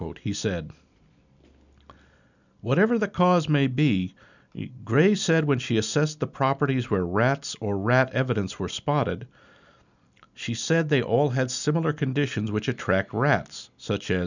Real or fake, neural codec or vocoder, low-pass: real; none; 7.2 kHz